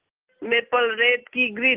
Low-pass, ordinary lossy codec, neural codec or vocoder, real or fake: 3.6 kHz; Opus, 16 kbps; none; real